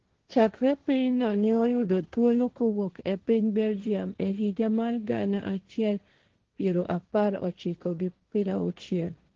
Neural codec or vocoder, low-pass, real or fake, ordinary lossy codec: codec, 16 kHz, 1.1 kbps, Voila-Tokenizer; 7.2 kHz; fake; Opus, 16 kbps